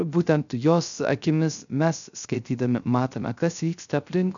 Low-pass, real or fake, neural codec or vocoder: 7.2 kHz; fake; codec, 16 kHz, 0.3 kbps, FocalCodec